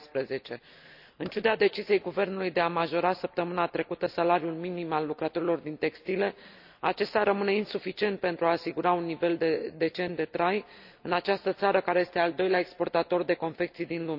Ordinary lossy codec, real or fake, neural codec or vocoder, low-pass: none; real; none; 5.4 kHz